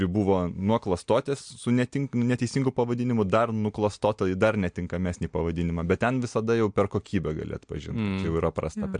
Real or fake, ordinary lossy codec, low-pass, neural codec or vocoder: real; MP3, 64 kbps; 9.9 kHz; none